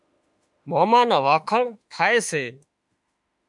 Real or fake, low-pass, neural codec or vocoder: fake; 10.8 kHz; autoencoder, 48 kHz, 32 numbers a frame, DAC-VAE, trained on Japanese speech